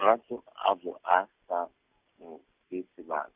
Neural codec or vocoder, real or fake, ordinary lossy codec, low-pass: none; real; Opus, 64 kbps; 3.6 kHz